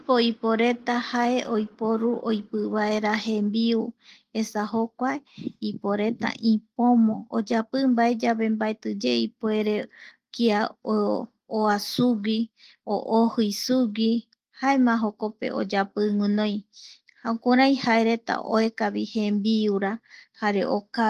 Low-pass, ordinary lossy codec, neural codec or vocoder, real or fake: 14.4 kHz; Opus, 24 kbps; none; real